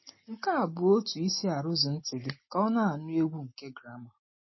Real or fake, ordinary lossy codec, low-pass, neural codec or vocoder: real; MP3, 24 kbps; 7.2 kHz; none